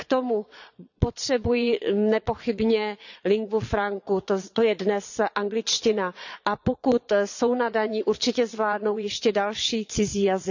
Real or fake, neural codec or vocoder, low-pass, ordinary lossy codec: fake; vocoder, 22.05 kHz, 80 mel bands, Vocos; 7.2 kHz; none